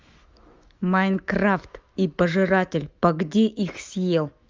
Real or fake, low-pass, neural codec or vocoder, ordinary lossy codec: fake; 7.2 kHz; autoencoder, 48 kHz, 128 numbers a frame, DAC-VAE, trained on Japanese speech; Opus, 32 kbps